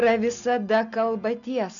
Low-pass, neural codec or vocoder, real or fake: 7.2 kHz; none; real